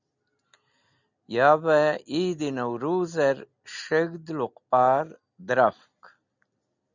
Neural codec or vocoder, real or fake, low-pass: none; real; 7.2 kHz